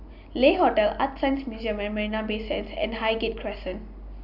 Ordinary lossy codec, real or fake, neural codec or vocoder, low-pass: none; real; none; 5.4 kHz